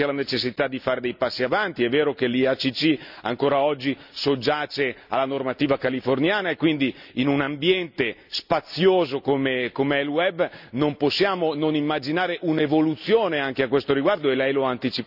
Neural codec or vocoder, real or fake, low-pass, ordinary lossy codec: none; real; 5.4 kHz; none